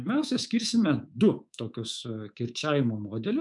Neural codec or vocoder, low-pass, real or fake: codec, 24 kHz, 3.1 kbps, DualCodec; 10.8 kHz; fake